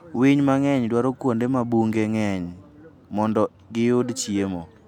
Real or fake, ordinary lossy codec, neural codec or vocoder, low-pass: real; none; none; 19.8 kHz